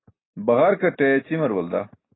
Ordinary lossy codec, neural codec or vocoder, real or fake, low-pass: AAC, 16 kbps; none; real; 7.2 kHz